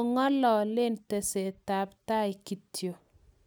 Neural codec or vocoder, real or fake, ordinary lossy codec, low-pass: none; real; none; none